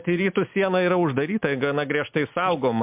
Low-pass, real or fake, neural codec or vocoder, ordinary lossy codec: 3.6 kHz; real; none; MP3, 32 kbps